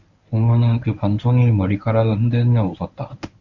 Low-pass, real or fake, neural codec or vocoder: 7.2 kHz; fake; codec, 24 kHz, 0.9 kbps, WavTokenizer, medium speech release version 1